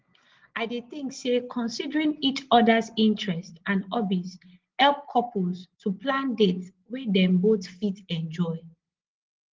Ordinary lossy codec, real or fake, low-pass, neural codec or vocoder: Opus, 16 kbps; real; 7.2 kHz; none